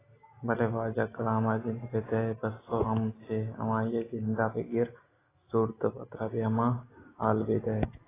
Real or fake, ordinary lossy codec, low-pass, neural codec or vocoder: real; AAC, 16 kbps; 3.6 kHz; none